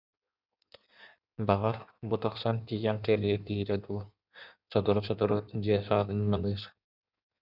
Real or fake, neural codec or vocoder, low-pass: fake; codec, 16 kHz in and 24 kHz out, 1.1 kbps, FireRedTTS-2 codec; 5.4 kHz